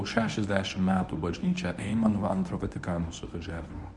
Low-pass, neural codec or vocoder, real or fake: 10.8 kHz; codec, 24 kHz, 0.9 kbps, WavTokenizer, medium speech release version 1; fake